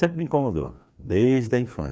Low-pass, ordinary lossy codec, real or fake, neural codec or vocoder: none; none; fake; codec, 16 kHz, 2 kbps, FreqCodec, larger model